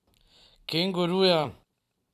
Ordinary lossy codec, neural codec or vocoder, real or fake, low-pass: none; vocoder, 44.1 kHz, 128 mel bands every 256 samples, BigVGAN v2; fake; 14.4 kHz